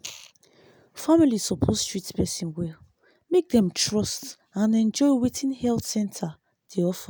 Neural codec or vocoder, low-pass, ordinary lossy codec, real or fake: none; none; none; real